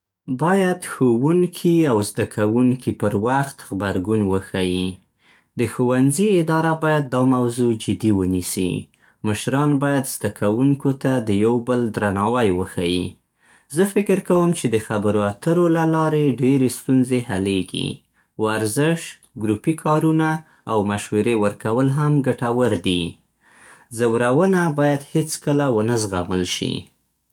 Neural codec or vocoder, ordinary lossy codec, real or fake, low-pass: codec, 44.1 kHz, 7.8 kbps, DAC; none; fake; 19.8 kHz